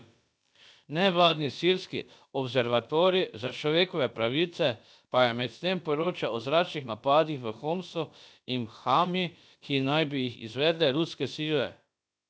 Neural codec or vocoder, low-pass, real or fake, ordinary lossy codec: codec, 16 kHz, about 1 kbps, DyCAST, with the encoder's durations; none; fake; none